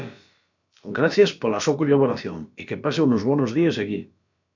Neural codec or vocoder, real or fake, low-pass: codec, 16 kHz, about 1 kbps, DyCAST, with the encoder's durations; fake; 7.2 kHz